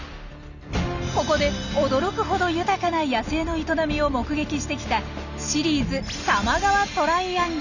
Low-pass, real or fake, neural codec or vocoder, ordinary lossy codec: 7.2 kHz; real; none; none